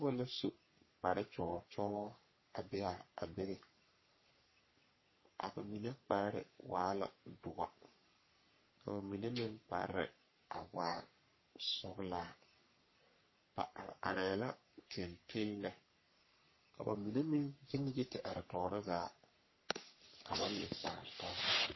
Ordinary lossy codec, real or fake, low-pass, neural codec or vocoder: MP3, 24 kbps; fake; 7.2 kHz; codec, 44.1 kHz, 3.4 kbps, Pupu-Codec